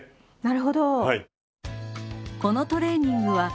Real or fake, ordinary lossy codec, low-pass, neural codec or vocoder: real; none; none; none